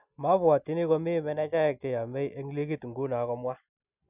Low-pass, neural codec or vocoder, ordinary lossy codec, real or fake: 3.6 kHz; none; none; real